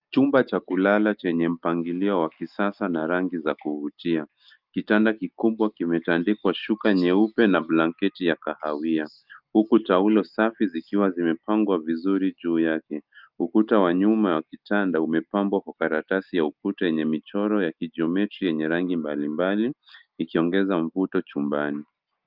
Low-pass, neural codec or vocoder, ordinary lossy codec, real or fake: 5.4 kHz; none; Opus, 24 kbps; real